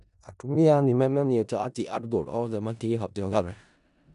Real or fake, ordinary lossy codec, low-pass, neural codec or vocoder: fake; none; 10.8 kHz; codec, 16 kHz in and 24 kHz out, 0.4 kbps, LongCat-Audio-Codec, four codebook decoder